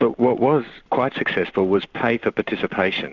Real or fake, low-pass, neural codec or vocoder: real; 7.2 kHz; none